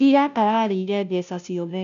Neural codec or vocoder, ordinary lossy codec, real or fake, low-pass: codec, 16 kHz, 0.5 kbps, FunCodec, trained on Chinese and English, 25 frames a second; none; fake; 7.2 kHz